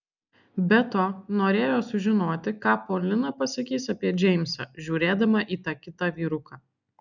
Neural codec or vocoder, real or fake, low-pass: none; real; 7.2 kHz